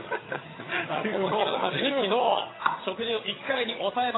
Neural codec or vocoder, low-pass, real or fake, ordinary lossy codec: vocoder, 22.05 kHz, 80 mel bands, HiFi-GAN; 7.2 kHz; fake; AAC, 16 kbps